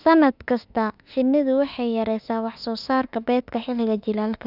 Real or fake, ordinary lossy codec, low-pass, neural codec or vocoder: fake; none; 5.4 kHz; autoencoder, 48 kHz, 32 numbers a frame, DAC-VAE, trained on Japanese speech